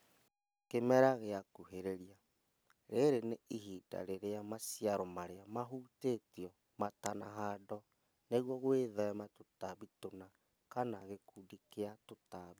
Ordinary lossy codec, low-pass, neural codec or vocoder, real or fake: none; none; none; real